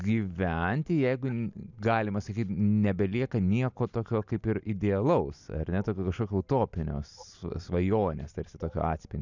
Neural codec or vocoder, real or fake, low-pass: none; real; 7.2 kHz